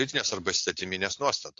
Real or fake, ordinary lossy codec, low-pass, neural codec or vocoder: real; AAC, 48 kbps; 7.2 kHz; none